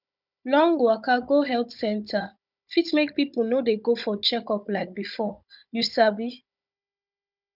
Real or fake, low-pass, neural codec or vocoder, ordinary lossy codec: fake; 5.4 kHz; codec, 16 kHz, 16 kbps, FunCodec, trained on Chinese and English, 50 frames a second; none